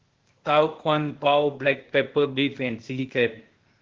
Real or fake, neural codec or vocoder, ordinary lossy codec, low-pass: fake; codec, 16 kHz, 0.8 kbps, ZipCodec; Opus, 16 kbps; 7.2 kHz